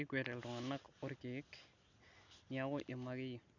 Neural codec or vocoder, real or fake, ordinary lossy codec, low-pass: none; real; none; 7.2 kHz